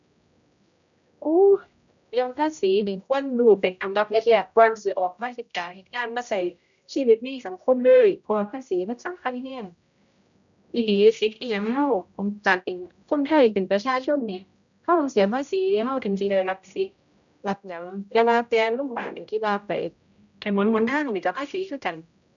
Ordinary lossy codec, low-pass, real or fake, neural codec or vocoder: none; 7.2 kHz; fake; codec, 16 kHz, 0.5 kbps, X-Codec, HuBERT features, trained on general audio